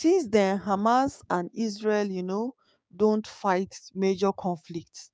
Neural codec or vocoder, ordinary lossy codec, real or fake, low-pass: codec, 16 kHz, 6 kbps, DAC; none; fake; none